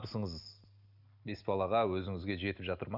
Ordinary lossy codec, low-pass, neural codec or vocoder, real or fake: none; 5.4 kHz; none; real